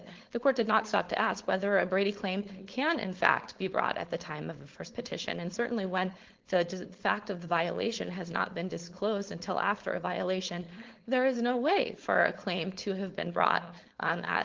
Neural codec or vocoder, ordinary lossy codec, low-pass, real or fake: codec, 16 kHz, 4.8 kbps, FACodec; Opus, 16 kbps; 7.2 kHz; fake